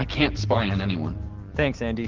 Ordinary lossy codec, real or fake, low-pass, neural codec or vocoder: Opus, 16 kbps; real; 7.2 kHz; none